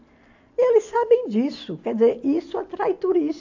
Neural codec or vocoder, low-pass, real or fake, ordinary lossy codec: none; 7.2 kHz; real; AAC, 48 kbps